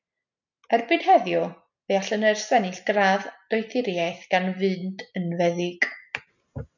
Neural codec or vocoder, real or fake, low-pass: none; real; 7.2 kHz